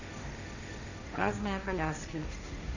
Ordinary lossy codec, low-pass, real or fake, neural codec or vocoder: none; 7.2 kHz; fake; codec, 16 kHz, 1.1 kbps, Voila-Tokenizer